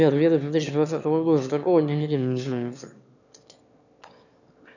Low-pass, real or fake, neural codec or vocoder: 7.2 kHz; fake; autoencoder, 22.05 kHz, a latent of 192 numbers a frame, VITS, trained on one speaker